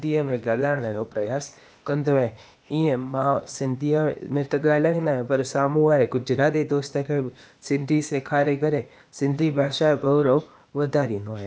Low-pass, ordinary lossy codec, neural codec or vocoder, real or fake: none; none; codec, 16 kHz, 0.8 kbps, ZipCodec; fake